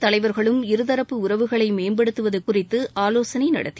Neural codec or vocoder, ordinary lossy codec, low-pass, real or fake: none; none; none; real